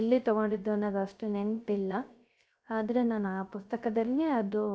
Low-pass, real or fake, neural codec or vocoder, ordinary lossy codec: none; fake; codec, 16 kHz, 0.3 kbps, FocalCodec; none